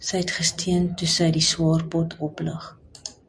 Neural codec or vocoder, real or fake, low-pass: none; real; 9.9 kHz